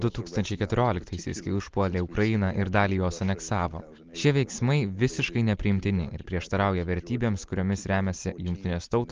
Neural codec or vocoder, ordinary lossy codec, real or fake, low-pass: none; Opus, 24 kbps; real; 7.2 kHz